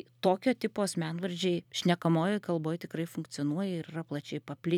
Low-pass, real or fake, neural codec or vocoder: 19.8 kHz; fake; vocoder, 44.1 kHz, 128 mel bands every 256 samples, BigVGAN v2